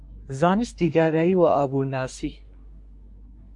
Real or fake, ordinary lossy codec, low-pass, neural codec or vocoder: fake; MP3, 64 kbps; 10.8 kHz; codec, 24 kHz, 1 kbps, SNAC